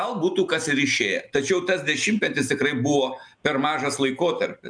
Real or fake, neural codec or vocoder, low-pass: real; none; 9.9 kHz